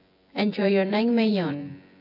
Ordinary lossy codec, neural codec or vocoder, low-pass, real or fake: AAC, 32 kbps; vocoder, 24 kHz, 100 mel bands, Vocos; 5.4 kHz; fake